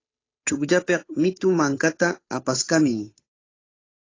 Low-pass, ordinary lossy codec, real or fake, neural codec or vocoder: 7.2 kHz; AAC, 32 kbps; fake; codec, 16 kHz, 8 kbps, FunCodec, trained on Chinese and English, 25 frames a second